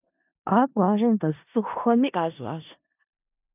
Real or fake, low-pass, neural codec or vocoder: fake; 3.6 kHz; codec, 16 kHz in and 24 kHz out, 0.4 kbps, LongCat-Audio-Codec, four codebook decoder